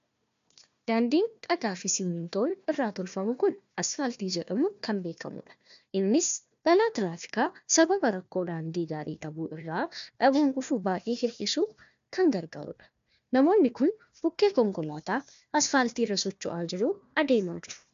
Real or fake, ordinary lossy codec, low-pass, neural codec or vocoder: fake; MP3, 64 kbps; 7.2 kHz; codec, 16 kHz, 1 kbps, FunCodec, trained on Chinese and English, 50 frames a second